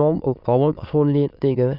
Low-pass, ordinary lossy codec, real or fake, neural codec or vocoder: 5.4 kHz; none; fake; autoencoder, 22.05 kHz, a latent of 192 numbers a frame, VITS, trained on many speakers